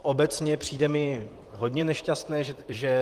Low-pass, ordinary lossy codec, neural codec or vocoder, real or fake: 14.4 kHz; Opus, 24 kbps; vocoder, 44.1 kHz, 128 mel bands, Pupu-Vocoder; fake